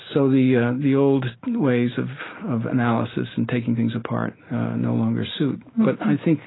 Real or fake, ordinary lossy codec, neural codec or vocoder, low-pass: real; AAC, 16 kbps; none; 7.2 kHz